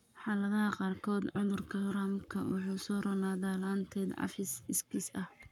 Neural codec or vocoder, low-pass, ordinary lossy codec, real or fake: codec, 44.1 kHz, 7.8 kbps, Pupu-Codec; 19.8 kHz; none; fake